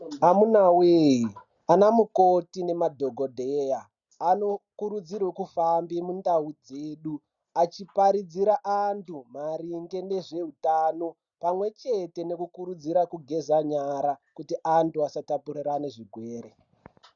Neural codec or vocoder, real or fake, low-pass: none; real; 7.2 kHz